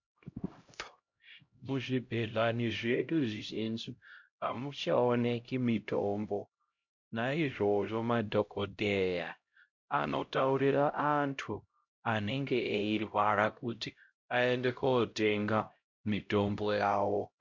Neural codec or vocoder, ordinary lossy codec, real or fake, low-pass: codec, 16 kHz, 0.5 kbps, X-Codec, HuBERT features, trained on LibriSpeech; MP3, 48 kbps; fake; 7.2 kHz